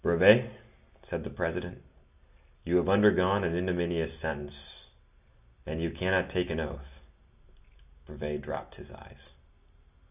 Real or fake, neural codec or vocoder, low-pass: real; none; 3.6 kHz